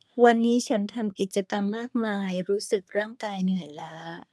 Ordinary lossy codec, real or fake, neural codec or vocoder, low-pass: none; fake; codec, 24 kHz, 1 kbps, SNAC; none